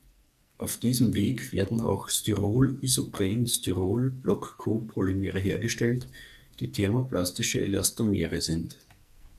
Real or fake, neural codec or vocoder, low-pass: fake; codec, 44.1 kHz, 2.6 kbps, SNAC; 14.4 kHz